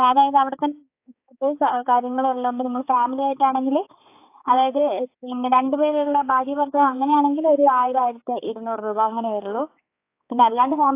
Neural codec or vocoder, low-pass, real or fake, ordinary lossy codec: codec, 16 kHz, 4 kbps, X-Codec, HuBERT features, trained on balanced general audio; 3.6 kHz; fake; AAC, 24 kbps